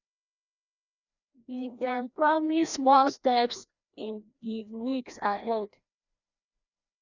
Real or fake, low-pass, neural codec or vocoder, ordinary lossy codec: fake; 7.2 kHz; codec, 16 kHz, 1 kbps, FreqCodec, larger model; none